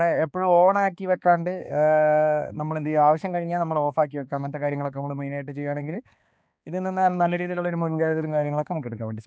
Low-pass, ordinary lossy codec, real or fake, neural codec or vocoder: none; none; fake; codec, 16 kHz, 2 kbps, X-Codec, HuBERT features, trained on balanced general audio